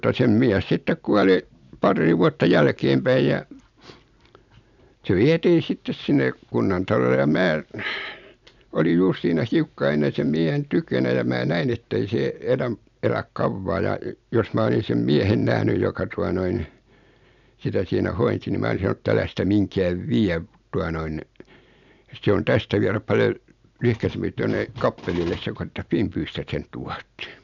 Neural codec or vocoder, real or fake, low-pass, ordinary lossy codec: none; real; 7.2 kHz; none